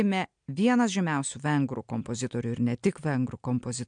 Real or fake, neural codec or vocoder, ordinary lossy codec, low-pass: real; none; MP3, 64 kbps; 9.9 kHz